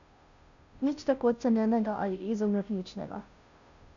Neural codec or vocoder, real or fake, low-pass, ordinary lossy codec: codec, 16 kHz, 0.5 kbps, FunCodec, trained on Chinese and English, 25 frames a second; fake; 7.2 kHz; none